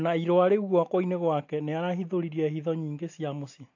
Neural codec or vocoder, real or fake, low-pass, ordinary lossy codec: none; real; 7.2 kHz; AAC, 48 kbps